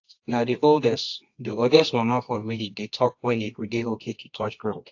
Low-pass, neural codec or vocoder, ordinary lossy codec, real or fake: 7.2 kHz; codec, 24 kHz, 0.9 kbps, WavTokenizer, medium music audio release; AAC, 48 kbps; fake